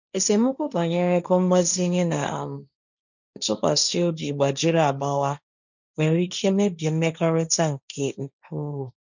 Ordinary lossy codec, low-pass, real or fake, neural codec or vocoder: none; 7.2 kHz; fake; codec, 16 kHz, 1.1 kbps, Voila-Tokenizer